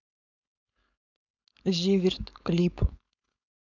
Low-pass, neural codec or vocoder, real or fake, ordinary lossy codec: 7.2 kHz; codec, 16 kHz, 4.8 kbps, FACodec; fake; none